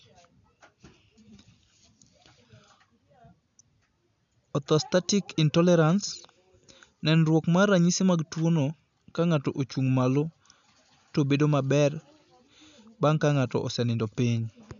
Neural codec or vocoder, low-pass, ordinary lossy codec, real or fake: none; 7.2 kHz; none; real